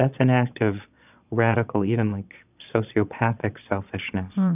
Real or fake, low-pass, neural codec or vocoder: fake; 3.6 kHz; vocoder, 22.05 kHz, 80 mel bands, Vocos